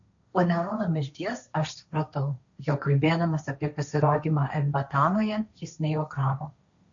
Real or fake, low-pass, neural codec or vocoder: fake; 7.2 kHz; codec, 16 kHz, 1.1 kbps, Voila-Tokenizer